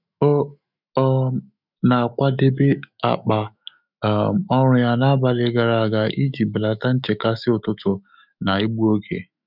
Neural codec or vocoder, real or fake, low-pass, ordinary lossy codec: autoencoder, 48 kHz, 128 numbers a frame, DAC-VAE, trained on Japanese speech; fake; 5.4 kHz; none